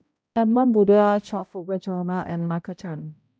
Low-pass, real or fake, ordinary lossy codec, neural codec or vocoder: none; fake; none; codec, 16 kHz, 0.5 kbps, X-Codec, HuBERT features, trained on balanced general audio